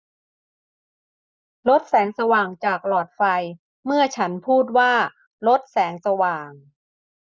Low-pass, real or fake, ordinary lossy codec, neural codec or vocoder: none; real; none; none